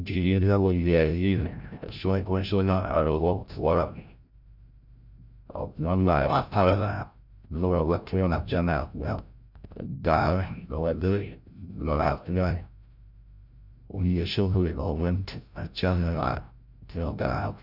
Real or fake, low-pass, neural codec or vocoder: fake; 5.4 kHz; codec, 16 kHz, 0.5 kbps, FreqCodec, larger model